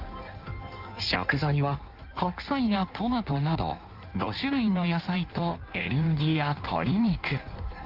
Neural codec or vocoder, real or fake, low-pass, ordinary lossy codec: codec, 16 kHz in and 24 kHz out, 1.1 kbps, FireRedTTS-2 codec; fake; 5.4 kHz; Opus, 32 kbps